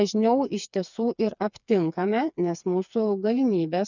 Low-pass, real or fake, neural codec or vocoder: 7.2 kHz; fake; codec, 16 kHz, 4 kbps, FreqCodec, smaller model